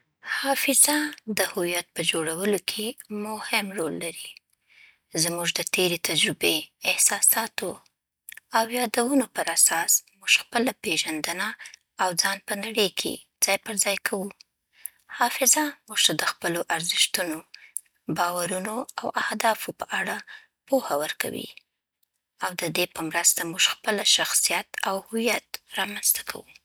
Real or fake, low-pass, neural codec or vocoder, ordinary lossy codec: real; none; none; none